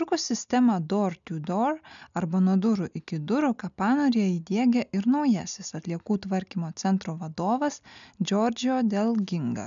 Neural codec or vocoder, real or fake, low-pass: none; real; 7.2 kHz